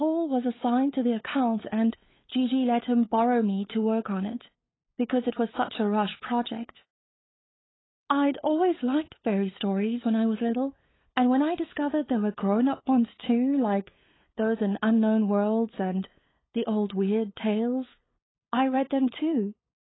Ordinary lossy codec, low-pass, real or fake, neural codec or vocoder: AAC, 16 kbps; 7.2 kHz; fake; codec, 16 kHz, 8 kbps, FunCodec, trained on LibriTTS, 25 frames a second